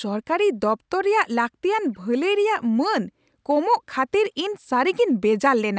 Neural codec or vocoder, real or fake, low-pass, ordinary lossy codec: none; real; none; none